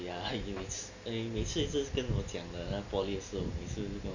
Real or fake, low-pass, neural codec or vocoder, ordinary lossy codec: real; 7.2 kHz; none; none